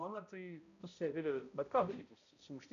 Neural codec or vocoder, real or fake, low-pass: codec, 16 kHz, 0.5 kbps, X-Codec, HuBERT features, trained on balanced general audio; fake; 7.2 kHz